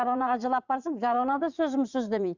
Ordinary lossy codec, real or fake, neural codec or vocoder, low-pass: Opus, 64 kbps; fake; vocoder, 44.1 kHz, 80 mel bands, Vocos; 7.2 kHz